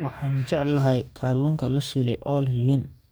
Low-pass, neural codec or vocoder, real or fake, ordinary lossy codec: none; codec, 44.1 kHz, 2.6 kbps, DAC; fake; none